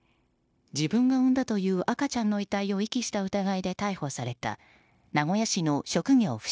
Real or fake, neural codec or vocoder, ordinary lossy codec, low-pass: fake; codec, 16 kHz, 0.9 kbps, LongCat-Audio-Codec; none; none